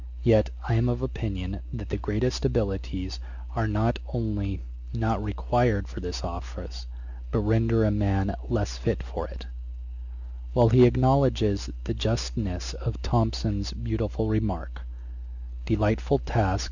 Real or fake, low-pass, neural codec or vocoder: real; 7.2 kHz; none